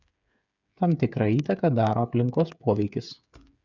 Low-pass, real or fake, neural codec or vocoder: 7.2 kHz; fake; codec, 16 kHz, 8 kbps, FreqCodec, smaller model